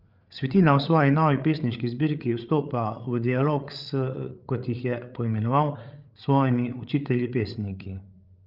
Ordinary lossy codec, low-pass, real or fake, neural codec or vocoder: Opus, 32 kbps; 5.4 kHz; fake; codec, 16 kHz, 8 kbps, FreqCodec, larger model